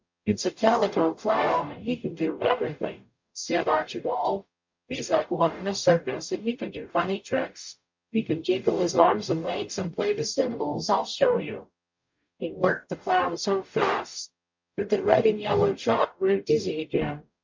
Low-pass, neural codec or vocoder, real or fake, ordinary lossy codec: 7.2 kHz; codec, 44.1 kHz, 0.9 kbps, DAC; fake; MP3, 48 kbps